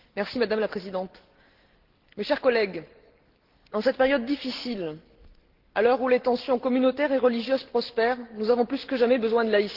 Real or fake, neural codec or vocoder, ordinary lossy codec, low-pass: real; none; Opus, 16 kbps; 5.4 kHz